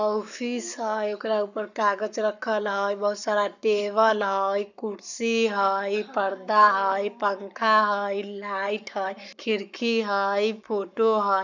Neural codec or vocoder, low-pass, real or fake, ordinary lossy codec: codec, 44.1 kHz, 7.8 kbps, Pupu-Codec; 7.2 kHz; fake; none